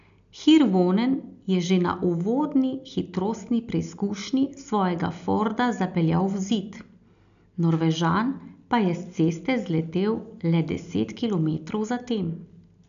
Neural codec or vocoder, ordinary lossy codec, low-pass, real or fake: none; none; 7.2 kHz; real